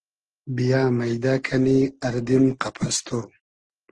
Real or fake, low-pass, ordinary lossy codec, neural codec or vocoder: real; 9.9 kHz; Opus, 16 kbps; none